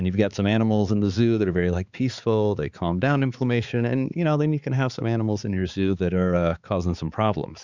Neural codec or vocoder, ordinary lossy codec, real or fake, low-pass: codec, 16 kHz, 4 kbps, X-Codec, HuBERT features, trained on balanced general audio; Opus, 64 kbps; fake; 7.2 kHz